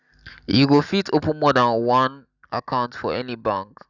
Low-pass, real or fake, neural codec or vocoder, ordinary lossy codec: 7.2 kHz; real; none; none